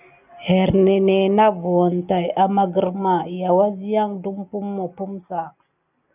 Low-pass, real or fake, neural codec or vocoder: 3.6 kHz; real; none